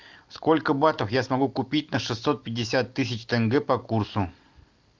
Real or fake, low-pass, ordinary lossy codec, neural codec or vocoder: real; 7.2 kHz; Opus, 24 kbps; none